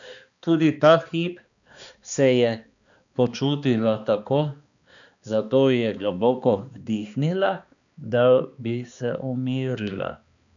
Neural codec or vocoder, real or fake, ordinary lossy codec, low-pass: codec, 16 kHz, 2 kbps, X-Codec, HuBERT features, trained on balanced general audio; fake; none; 7.2 kHz